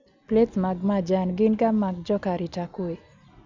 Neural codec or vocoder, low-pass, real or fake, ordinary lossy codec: none; 7.2 kHz; real; none